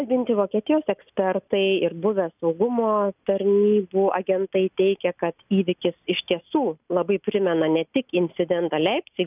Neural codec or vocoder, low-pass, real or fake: none; 3.6 kHz; real